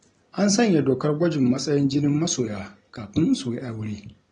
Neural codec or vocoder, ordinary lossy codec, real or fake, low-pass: none; AAC, 32 kbps; real; 9.9 kHz